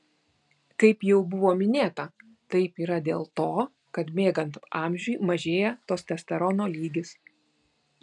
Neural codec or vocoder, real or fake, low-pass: none; real; 10.8 kHz